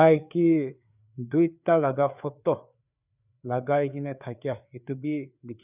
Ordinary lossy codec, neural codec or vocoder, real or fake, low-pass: none; codec, 16 kHz, 4 kbps, FreqCodec, larger model; fake; 3.6 kHz